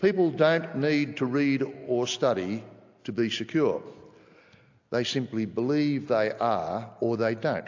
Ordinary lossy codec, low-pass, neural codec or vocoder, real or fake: AAC, 48 kbps; 7.2 kHz; none; real